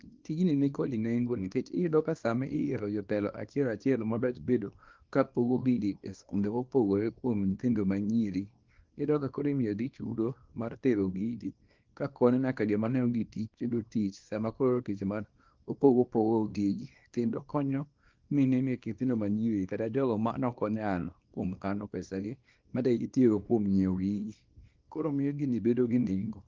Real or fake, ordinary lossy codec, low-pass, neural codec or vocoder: fake; Opus, 32 kbps; 7.2 kHz; codec, 24 kHz, 0.9 kbps, WavTokenizer, small release